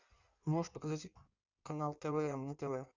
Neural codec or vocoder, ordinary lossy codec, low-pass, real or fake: codec, 16 kHz in and 24 kHz out, 1.1 kbps, FireRedTTS-2 codec; Opus, 64 kbps; 7.2 kHz; fake